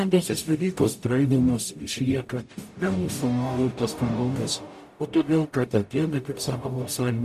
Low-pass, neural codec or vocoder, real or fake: 14.4 kHz; codec, 44.1 kHz, 0.9 kbps, DAC; fake